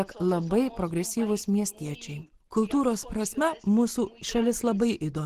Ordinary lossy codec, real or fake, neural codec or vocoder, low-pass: Opus, 16 kbps; real; none; 14.4 kHz